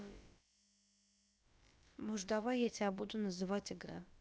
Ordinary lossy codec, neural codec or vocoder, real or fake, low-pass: none; codec, 16 kHz, about 1 kbps, DyCAST, with the encoder's durations; fake; none